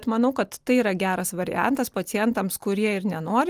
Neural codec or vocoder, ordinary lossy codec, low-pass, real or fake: none; Opus, 32 kbps; 14.4 kHz; real